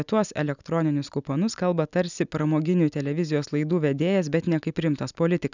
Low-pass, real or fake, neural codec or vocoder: 7.2 kHz; real; none